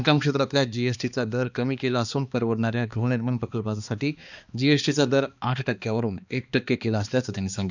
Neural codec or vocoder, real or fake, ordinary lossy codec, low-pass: codec, 16 kHz, 2 kbps, X-Codec, HuBERT features, trained on balanced general audio; fake; none; 7.2 kHz